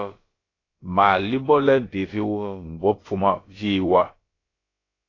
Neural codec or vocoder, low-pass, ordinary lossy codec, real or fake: codec, 16 kHz, about 1 kbps, DyCAST, with the encoder's durations; 7.2 kHz; AAC, 32 kbps; fake